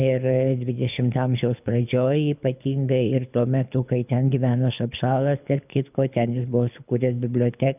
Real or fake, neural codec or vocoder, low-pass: fake; codec, 24 kHz, 6 kbps, HILCodec; 3.6 kHz